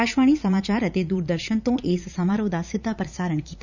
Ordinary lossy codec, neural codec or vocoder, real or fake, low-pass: none; vocoder, 44.1 kHz, 80 mel bands, Vocos; fake; 7.2 kHz